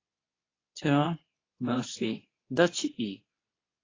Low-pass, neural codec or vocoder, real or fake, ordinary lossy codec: 7.2 kHz; codec, 24 kHz, 0.9 kbps, WavTokenizer, medium speech release version 2; fake; AAC, 32 kbps